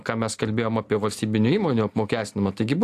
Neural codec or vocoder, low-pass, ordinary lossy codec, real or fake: none; 14.4 kHz; AAC, 64 kbps; real